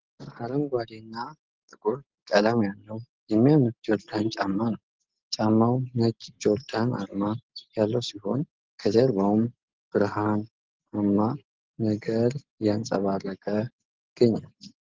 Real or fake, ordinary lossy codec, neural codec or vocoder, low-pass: real; Opus, 16 kbps; none; 7.2 kHz